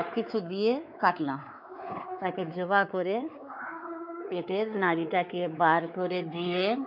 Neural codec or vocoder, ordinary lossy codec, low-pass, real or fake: codec, 16 kHz, 4 kbps, X-Codec, WavLM features, trained on Multilingual LibriSpeech; none; 5.4 kHz; fake